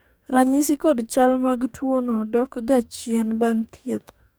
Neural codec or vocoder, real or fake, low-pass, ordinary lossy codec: codec, 44.1 kHz, 2.6 kbps, DAC; fake; none; none